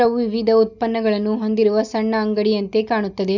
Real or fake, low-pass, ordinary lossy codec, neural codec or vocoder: real; 7.2 kHz; none; none